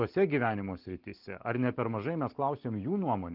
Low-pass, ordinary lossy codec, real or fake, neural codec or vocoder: 5.4 kHz; Opus, 16 kbps; real; none